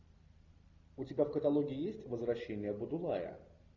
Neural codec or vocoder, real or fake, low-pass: none; real; 7.2 kHz